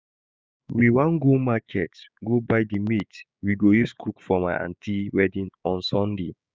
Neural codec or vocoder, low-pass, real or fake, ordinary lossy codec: codec, 16 kHz, 6 kbps, DAC; none; fake; none